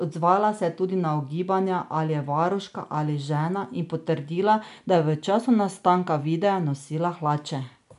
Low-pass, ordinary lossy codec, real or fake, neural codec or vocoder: 10.8 kHz; none; real; none